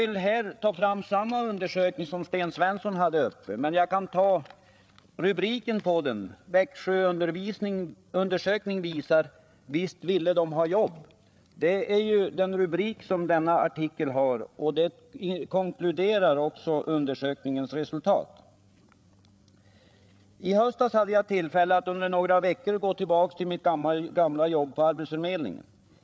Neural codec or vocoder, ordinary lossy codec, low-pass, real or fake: codec, 16 kHz, 8 kbps, FreqCodec, larger model; none; none; fake